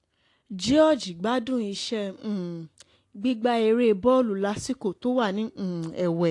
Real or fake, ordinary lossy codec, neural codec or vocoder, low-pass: real; AAC, 48 kbps; none; 10.8 kHz